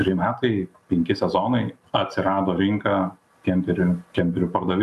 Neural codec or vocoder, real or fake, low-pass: none; real; 14.4 kHz